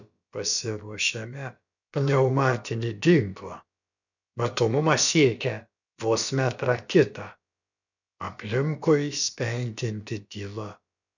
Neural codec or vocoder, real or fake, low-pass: codec, 16 kHz, about 1 kbps, DyCAST, with the encoder's durations; fake; 7.2 kHz